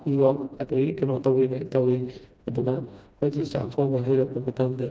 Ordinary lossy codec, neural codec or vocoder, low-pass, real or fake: none; codec, 16 kHz, 1 kbps, FreqCodec, smaller model; none; fake